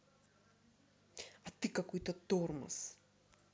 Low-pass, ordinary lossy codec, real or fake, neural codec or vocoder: none; none; real; none